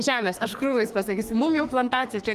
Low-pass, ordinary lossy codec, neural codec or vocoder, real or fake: 14.4 kHz; Opus, 32 kbps; codec, 44.1 kHz, 2.6 kbps, SNAC; fake